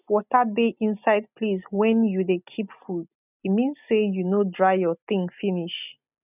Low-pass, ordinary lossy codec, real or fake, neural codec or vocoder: 3.6 kHz; none; real; none